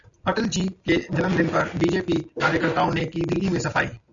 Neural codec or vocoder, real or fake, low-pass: none; real; 7.2 kHz